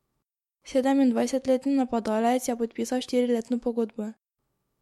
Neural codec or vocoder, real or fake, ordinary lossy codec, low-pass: autoencoder, 48 kHz, 128 numbers a frame, DAC-VAE, trained on Japanese speech; fake; MP3, 64 kbps; 19.8 kHz